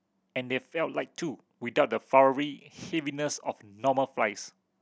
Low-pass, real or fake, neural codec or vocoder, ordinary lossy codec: none; real; none; none